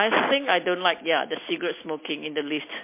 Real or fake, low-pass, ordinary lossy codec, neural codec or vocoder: real; 3.6 kHz; MP3, 24 kbps; none